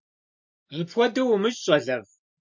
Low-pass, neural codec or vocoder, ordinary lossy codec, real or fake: 7.2 kHz; none; MP3, 48 kbps; real